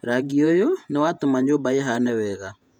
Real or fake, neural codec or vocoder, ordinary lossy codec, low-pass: fake; vocoder, 48 kHz, 128 mel bands, Vocos; none; 19.8 kHz